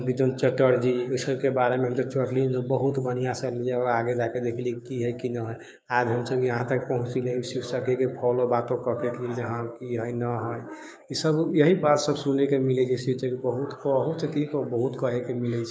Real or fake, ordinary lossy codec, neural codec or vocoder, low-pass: fake; none; codec, 16 kHz, 6 kbps, DAC; none